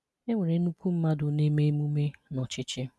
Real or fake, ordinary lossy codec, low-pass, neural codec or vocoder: real; none; none; none